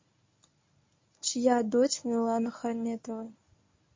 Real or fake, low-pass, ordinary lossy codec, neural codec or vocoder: fake; 7.2 kHz; MP3, 32 kbps; codec, 24 kHz, 0.9 kbps, WavTokenizer, medium speech release version 1